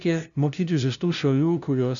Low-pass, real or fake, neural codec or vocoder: 7.2 kHz; fake; codec, 16 kHz, 0.5 kbps, FunCodec, trained on LibriTTS, 25 frames a second